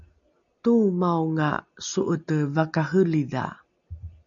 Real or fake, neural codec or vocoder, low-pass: real; none; 7.2 kHz